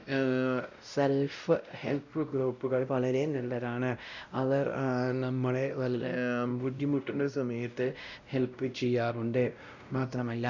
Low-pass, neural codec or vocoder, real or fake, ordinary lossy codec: 7.2 kHz; codec, 16 kHz, 0.5 kbps, X-Codec, WavLM features, trained on Multilingual LibriSpeech; fake; none